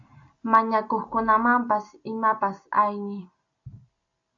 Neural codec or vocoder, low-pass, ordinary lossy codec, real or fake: none; 7.2 kHz; AAC, 48 kbps; real